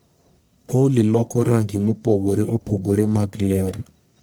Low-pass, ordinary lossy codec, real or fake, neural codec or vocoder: none; none; fake; codec, 44.1 kHz, 1.7 kbps, Pupu-Codec